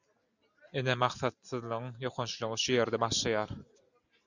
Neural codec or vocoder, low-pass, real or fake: none; 7.2 kHz; real